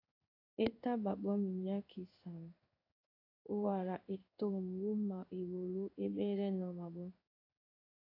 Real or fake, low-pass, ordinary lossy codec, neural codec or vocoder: fake; 5.4 kHz; AAC, 32 kbps; codec, 16 kHz in and 24 kHz out, 1 kbps, XY-Tokenizer